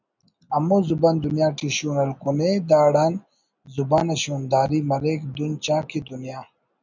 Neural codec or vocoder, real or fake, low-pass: none; real; 7.2 kHz